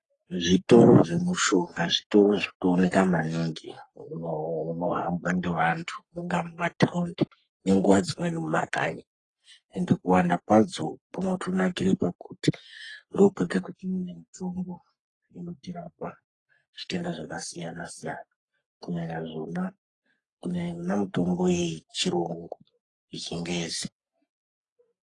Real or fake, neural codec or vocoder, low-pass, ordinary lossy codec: fake; codec, 44.1 kHz, 3.4 kbps, Pupu-Codec; 10.8 kHz; AAC, 32 kbps